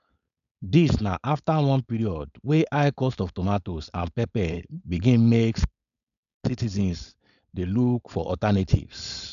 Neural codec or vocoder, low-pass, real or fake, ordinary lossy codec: codec, 16 kHz, 4.8 kbps, FACodec; 7.2 kHz; fake; none